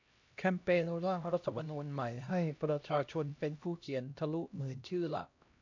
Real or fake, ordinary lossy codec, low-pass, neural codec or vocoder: fake; AAC, 48 kbps; 7.2 kHz; codec, 16 kHz, 1 kbps, X-Codec, HuBERT features, trained on LibriSpeech